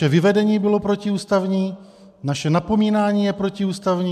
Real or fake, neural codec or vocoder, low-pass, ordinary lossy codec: real; none; 14.4 kHz; AAC, 96 kbps